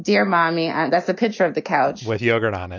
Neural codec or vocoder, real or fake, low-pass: none; real; 7.2 kHz